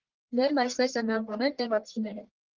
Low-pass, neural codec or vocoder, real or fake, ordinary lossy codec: 7.2 kHz; codec, 44.1 kHz, 1.7 kbps, Pupu-Codec; fake; Opus, 16 kbps